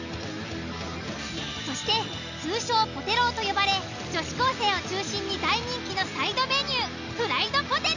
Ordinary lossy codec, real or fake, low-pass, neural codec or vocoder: none; real; 7.2 kHz; none